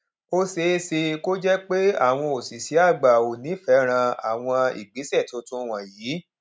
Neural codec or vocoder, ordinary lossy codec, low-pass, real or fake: none; none; none; real